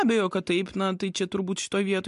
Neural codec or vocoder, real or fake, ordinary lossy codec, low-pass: none; real; MP3, 64 kbps; 10.8 kHz